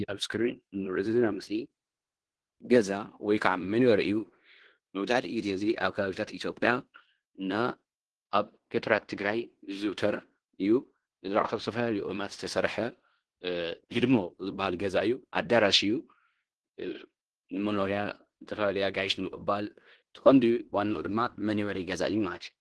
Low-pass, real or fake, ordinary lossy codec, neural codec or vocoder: 10.8 kHz; fake; Opus, 16 kbps; codec, 16 kHz in and 24 kHz out, 0.9 kbps, LongCat-Audio-Codec, fine tuned four codebook decoder